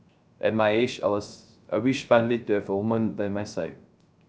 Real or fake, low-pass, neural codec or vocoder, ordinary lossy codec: fake; none; codec, 16 kHz, 0.3 kbps, FocalCodec; none